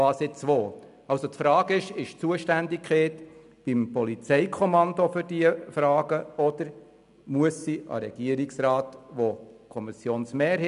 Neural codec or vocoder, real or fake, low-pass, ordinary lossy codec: none; real; 10.8 kHz; none